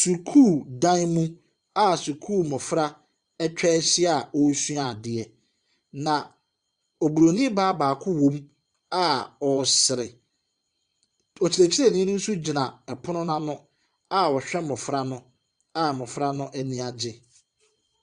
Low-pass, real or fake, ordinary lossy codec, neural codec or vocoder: 9.9 kHz; fake; Opus, 64 kbps; vocoder, 22.05 kHz, 80 mel bands, Vocos